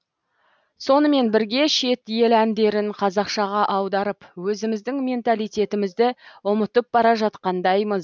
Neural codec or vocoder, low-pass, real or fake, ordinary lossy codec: none; none; real; none